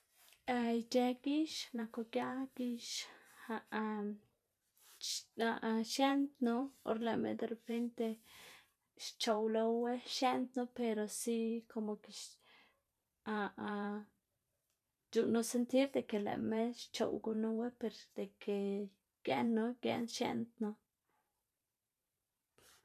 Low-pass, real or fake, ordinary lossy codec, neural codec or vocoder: 14.4 kHz; fake; none; vocoder, 44.1 kHz, 128 mel bands every 512 samples, BigVGAN v2